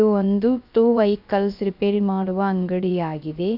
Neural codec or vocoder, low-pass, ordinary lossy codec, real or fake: codec, 16 kHz, 0.3 kbps, FocalCodec; 5.4 kHz; none; fake